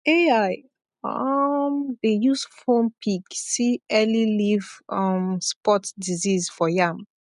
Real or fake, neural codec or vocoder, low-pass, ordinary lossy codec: real; none; 10.8 kHz; none